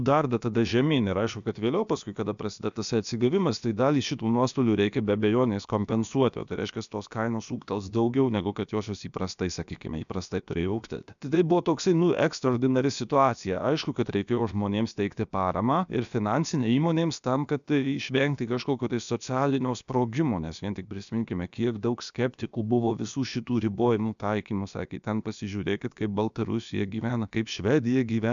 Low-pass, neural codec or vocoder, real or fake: 7.2 kHz; codec, 16 kHz, about 1 kbps, DyCAST, with the encoder's durations; fake